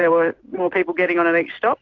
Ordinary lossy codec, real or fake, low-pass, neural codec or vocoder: AAC, 48 kbps; real; 7.2 kHz; none